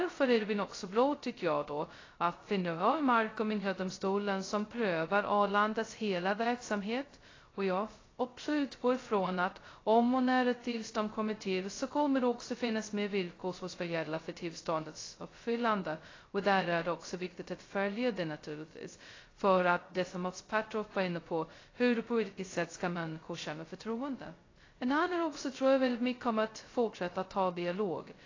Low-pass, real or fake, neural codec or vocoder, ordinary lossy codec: 7.2 kHz; fake; codec, 16 kHz, 0.2 kbps, FocalCodec; AAC, 32 kbps